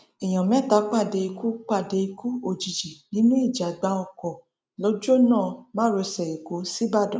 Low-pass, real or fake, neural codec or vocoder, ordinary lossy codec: none; real; none; none